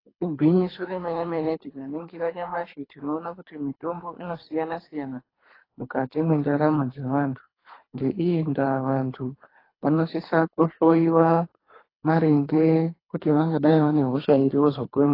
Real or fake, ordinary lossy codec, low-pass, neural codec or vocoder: fake; AAC, 24 kbps; 5.4 kHz; codec, 24 kHz, 3 kbps, HILCodec